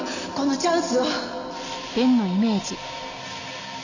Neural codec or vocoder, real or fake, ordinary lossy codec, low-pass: none; real; none; 7.2 kHz